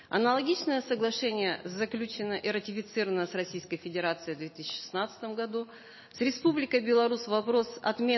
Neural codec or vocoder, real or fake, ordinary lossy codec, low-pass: none; real; MP3, 24 kbps; 7.2 kHz